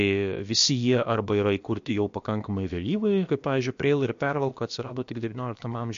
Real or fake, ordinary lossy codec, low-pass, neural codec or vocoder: fake; MP3, 48 kbps; 7.2 kHz; codec, 16 kHz, about 1 kbps, DyCAST, with the encoder's durations